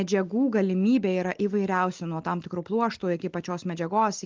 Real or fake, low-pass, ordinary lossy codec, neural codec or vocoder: real; 7.2 kHz; Opus, 32 kbps; none